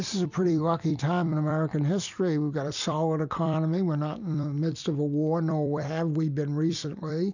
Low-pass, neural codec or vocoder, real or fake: 7.2 kHz; vocoder, 44.1 kHz, 128 mel bands every 256 samples, BigVGAN v2; fake